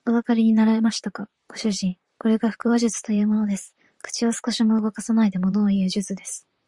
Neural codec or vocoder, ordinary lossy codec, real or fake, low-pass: vocoder, 44.1 kHz, 128 mel bands, Pupu-Vocoder; Opus, 64 kbps; fake; 10.8 kHz